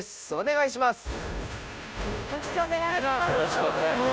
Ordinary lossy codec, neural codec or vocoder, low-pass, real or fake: none; codec, 16 kHz, 0.5 kbps, FunCodec, trained on Chinese and English, 25 frames a second; none; fake